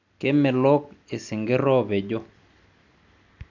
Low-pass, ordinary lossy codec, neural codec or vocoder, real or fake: 7.2 kHz; none; none; real